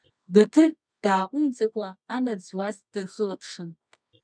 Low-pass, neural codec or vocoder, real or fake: 9.9 kHz; codec, 24 kHz, 0.9 kbps, WavTokenizer, medium music audio release; fake